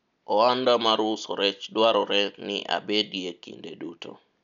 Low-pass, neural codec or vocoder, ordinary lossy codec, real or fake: 7.2 kHz; none; none; real